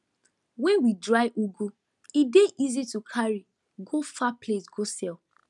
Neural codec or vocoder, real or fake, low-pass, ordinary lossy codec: none; real; 10.8 kHz; none